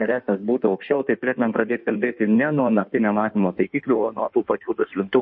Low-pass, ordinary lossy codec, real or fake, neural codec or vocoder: 9.9 kHz; MP3, 32 kbps; fake; codec, 16 kHz in and 24 kHz out, 1.1 kbps, FireRedTTS-2 codec